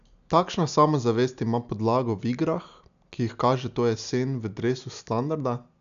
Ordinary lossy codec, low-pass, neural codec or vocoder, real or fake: none; 7.2 kHz; none; real